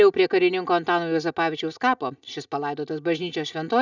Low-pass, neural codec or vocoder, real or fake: 7.2 kHz; none; real